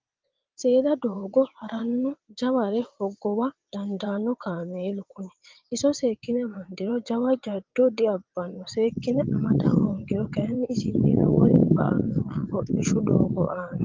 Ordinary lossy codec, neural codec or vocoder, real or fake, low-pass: Opus, 24 kbps; vocoder, 22.05 kHz, 80 mel bands, WaveNeXt; fake; 7.2 kHz